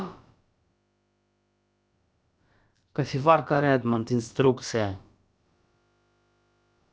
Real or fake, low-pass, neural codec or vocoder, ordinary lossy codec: fake; none; codec, 16 kHz, about 1 kbps, DyCAST, with the encoder's durations; none